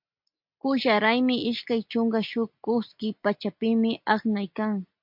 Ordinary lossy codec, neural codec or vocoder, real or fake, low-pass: MP3, 48 kbps; none; real; 5.4 kHz